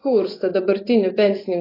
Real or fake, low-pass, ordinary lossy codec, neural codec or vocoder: fake; 5.4 kHz; AAC, 24 kbps; vocoder, 24 kHz, 100 mel bands, Vocos